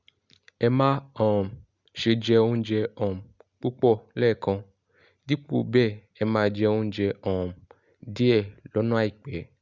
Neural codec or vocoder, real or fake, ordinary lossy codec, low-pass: none; real; Opus, 64 kbps; 7.2 kHz